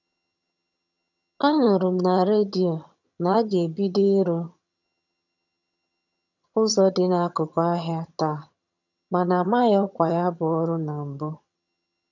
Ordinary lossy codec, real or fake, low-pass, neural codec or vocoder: none; fake; 7.2 kHz; vocoder, 22.05 kHz, 80 mel bands, HiFi-GAN